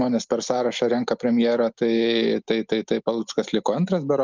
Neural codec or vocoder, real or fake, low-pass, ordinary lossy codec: none; real; 7.2 kHz; Opus, 24 kbps